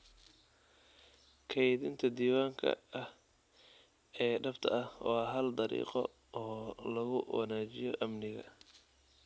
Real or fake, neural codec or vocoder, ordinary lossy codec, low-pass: real; none; none; none